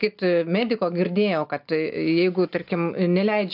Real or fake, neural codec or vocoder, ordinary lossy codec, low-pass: real; none; AAC, 48 kbps; 5.4 kHz